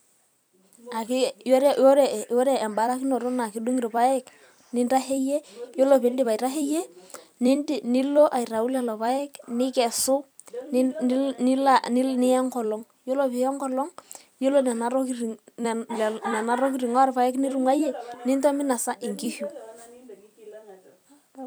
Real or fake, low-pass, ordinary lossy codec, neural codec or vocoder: fake; none; none; vocoder, 44.1 kHz, 128 mel bands every 256 samples, BigVGAN v2